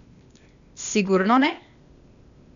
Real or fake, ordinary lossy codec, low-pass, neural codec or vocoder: fake; none; 7.2 kHz; codec, 16 kHz, 0.8 kbps, ZipCodec